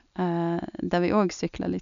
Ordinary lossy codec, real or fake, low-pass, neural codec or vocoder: none; real; 7.2 kHz; none